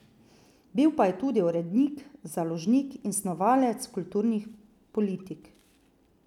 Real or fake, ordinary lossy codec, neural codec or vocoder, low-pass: real; none; none; 19.8 kHz